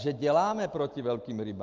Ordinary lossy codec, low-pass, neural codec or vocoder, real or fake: Opus, 32 kbps; 7.2 kHz; none; real